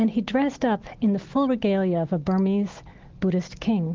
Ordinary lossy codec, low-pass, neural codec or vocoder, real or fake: Opus, 16 kbps; 7.2 kHz; none; real